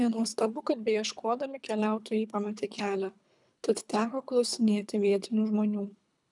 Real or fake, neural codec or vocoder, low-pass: fake; codec, 24 kHz, 3 kbps, HILCodec; 10.8 kHz